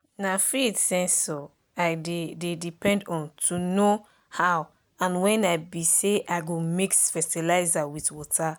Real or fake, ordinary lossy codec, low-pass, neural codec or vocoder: real; none; none; none